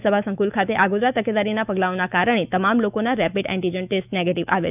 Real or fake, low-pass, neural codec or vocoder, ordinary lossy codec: fake; 3.6 kHz; autoencoder, 48 kHz, 128 numbers a frame, DAC-VAE, trained on Japanese speech; none